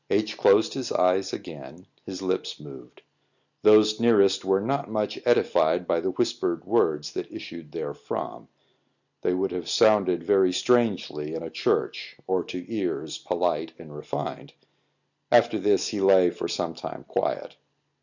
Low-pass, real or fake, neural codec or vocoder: 7.2 kHz; real; none